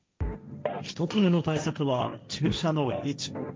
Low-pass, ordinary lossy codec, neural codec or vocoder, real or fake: none; none; codec, 16 kHz, 1.1 kbps, Voila-Tokenizer; fake